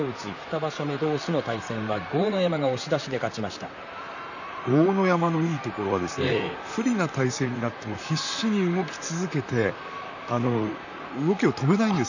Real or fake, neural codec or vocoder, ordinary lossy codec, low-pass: fake; vocoder, 44.1 kHz, 128 mel bands, Pupu-Vocoder; none; 7.2 kHz